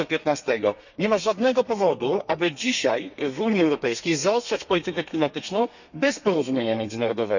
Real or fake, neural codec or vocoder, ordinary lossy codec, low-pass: fake; codec, 32 kHz, 1.9 kbps, SNAC; none; 7.2 kHz